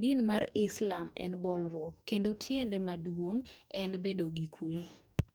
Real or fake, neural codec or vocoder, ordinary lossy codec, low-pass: fake; codec, 44.1 kHz, 2.6 kbps, DAC; none; none